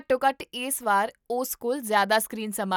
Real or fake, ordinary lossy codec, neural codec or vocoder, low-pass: real; none; none; none